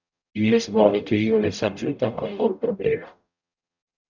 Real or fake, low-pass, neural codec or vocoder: fake; 7.2 kHz; codec, 44.1 kHz, 0.9 kbps, DAC